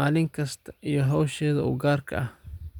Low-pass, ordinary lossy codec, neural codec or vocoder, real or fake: 19.8 kHz; none; none; real